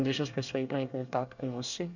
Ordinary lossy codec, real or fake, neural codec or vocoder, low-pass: none; fake; codec, 24 kHz, 1 kbps, SNAC; 7.2 kHz